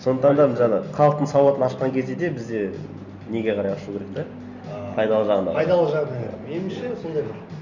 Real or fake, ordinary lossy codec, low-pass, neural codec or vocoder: real; none; 7.2 kHz; none